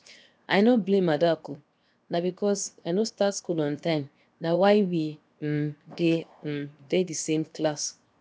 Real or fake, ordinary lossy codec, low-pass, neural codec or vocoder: fake; none; none; codec, 16 kHz, 0.7 kbps, FocalCodec